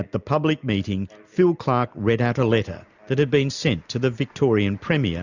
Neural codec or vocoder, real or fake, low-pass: none; real; 7.2 kHz